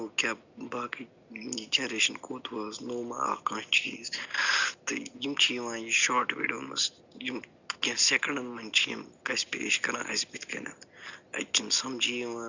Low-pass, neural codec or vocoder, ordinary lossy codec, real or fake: 7.2 kHz; none; Opus, 64 kbps; real